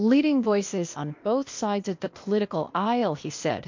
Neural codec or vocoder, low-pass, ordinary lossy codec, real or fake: codec, 16 kHz, 0.8 kbps, ZipCodec; 7.2 kHz; MP3, 48 kbps; fake